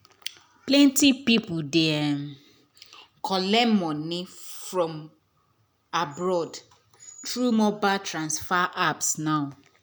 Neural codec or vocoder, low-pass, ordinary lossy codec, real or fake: none; none; none; real